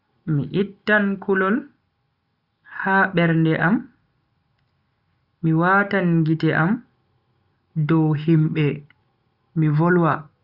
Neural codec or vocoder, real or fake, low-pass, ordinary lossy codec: none; real; 5.4 kHz; Opus, 64 kbps